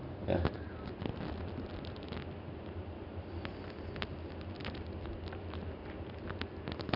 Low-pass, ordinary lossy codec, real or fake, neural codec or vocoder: 5.4 kHz; none; real; none